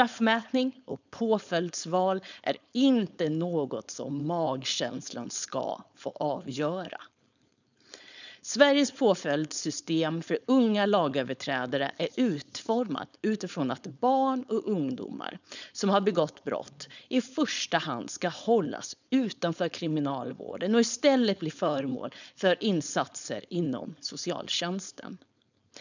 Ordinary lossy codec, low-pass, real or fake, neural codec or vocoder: none; 7.2 kHz; fake; codec, 16 kHz, 4.8 kbps, FACodec